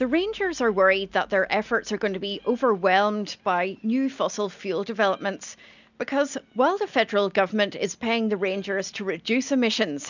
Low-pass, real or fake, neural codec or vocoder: 7.2 kHz; real; none